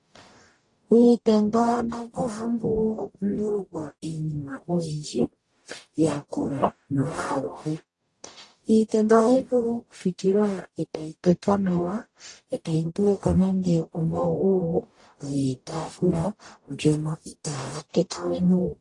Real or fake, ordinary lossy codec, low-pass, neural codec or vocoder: fake; AAC, 48 kbps; 10.8 kHz; codec, 44.1 kHz, 0.9 kbps, DAC